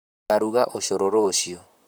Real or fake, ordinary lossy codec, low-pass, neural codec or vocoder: fake; none; none; vocoder, 44.1 kHz, 128 mel bands, Pupu-Vocoder